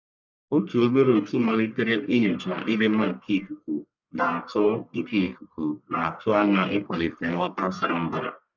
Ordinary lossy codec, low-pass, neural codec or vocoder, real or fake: none; 7.2 kHz; codec, 44.1 kHz, 1.7 kbps, Pupu-Codec; fake